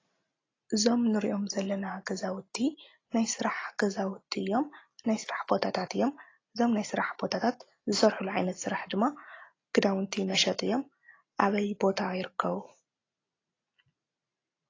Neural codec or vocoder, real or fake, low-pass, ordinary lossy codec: none; real; 7.2 kHz; AAC, 32 kbps